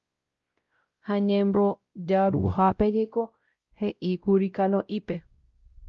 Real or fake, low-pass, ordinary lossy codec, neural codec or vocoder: fake; 7.2 kHz; Opus, 32 kbps; codec, 16 kHz, 0.5 kbps, X-Codec, WavLM features, trained on Multilingual LibriSpeech